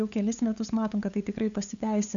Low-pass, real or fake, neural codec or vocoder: 7.2 kHz; fake; codec, 16 kHz, 8 kbps, FunCodec, trained on LibriTTS, 25 frames a second